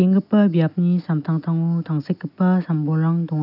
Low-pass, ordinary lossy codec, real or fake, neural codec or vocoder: 5.4 kHz; none; real; none